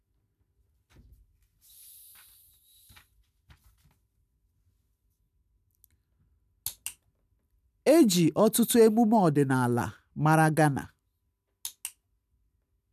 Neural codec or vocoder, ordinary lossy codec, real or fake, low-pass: none; none; real; 14.4 kHz